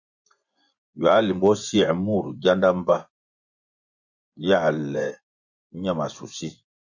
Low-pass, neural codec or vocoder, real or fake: 7.2 kHz; vocoder, 24 kHz, 100 mel bands, Vocos; fake